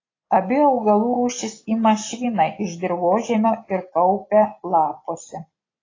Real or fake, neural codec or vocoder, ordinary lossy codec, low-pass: real; none; AAC, 32 kbps; 7.2 kHz